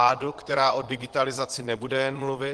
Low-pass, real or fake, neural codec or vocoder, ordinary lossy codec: 9.9 kHz; fake; vocoder, 22.05 kHz, 80 mel bands, Vocos; Opus, 16 kbps